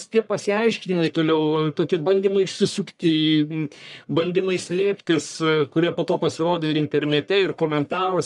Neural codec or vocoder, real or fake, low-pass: codec, 44.1 kHz, 1.7 kbps, Pupu-Codec; fake; 10.8 kHz